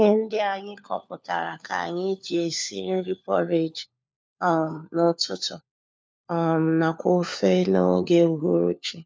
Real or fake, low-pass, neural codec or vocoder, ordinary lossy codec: fake; none; codec, 16 kHz, 4 kbps, FunCodec, trained on LibriTTS, 50 frames a second; none